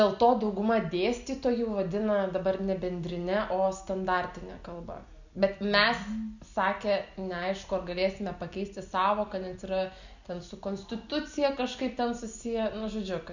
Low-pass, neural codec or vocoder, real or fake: 7.2 kHz; none; real